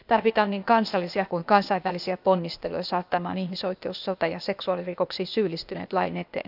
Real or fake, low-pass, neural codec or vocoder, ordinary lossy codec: fake; 5.4 kHz; codec, 16 kHz, 0.8 kbps, ZipCodec; none